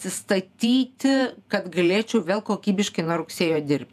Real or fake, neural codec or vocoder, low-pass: fake; vocoder, 48 kHz, 128 mel bands, Vocos; 14.4 kHz